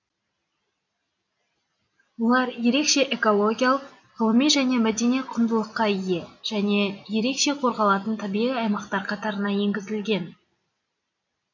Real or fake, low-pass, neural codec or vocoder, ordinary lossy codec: real; 7.2 kHz; none; none